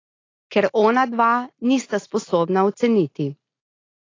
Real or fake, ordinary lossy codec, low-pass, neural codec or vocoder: real; AAC, 32 kbps; 7.2 kHz; none